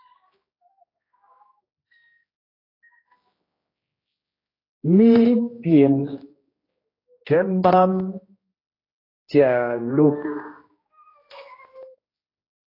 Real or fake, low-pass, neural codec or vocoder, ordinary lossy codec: fake; 5.4 kHz; codec, 16 kHz, 1 kbps, X-Codec, HuBERT features, trained on general audio; AAC, 24 kbps